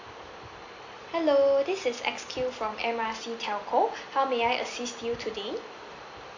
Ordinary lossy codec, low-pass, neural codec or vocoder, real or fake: AAC, 48 kbps; 7.2 kHz; none; real